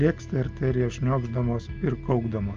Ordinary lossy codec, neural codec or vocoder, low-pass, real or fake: Opus, 24 kbps; none; 7.2 kHz; real